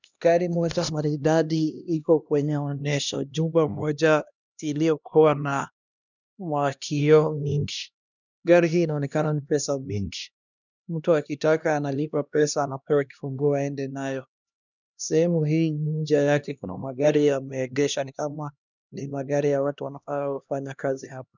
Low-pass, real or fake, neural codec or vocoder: 7.2 kHz; fake; codec, 16 kHz, 1 kbps, X-Codec, HuBERT features, trained on LibriSpeech